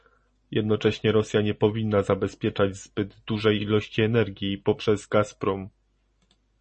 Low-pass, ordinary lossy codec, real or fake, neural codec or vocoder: 10.8 kHz; MP3, 32 kbps; real; none